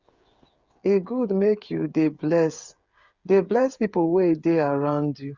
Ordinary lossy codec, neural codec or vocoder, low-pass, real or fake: Opus, 64 kbps; codec, 16 kHz, 8 kbps, FreqCodec, smaller model; 7.2 kHz; fake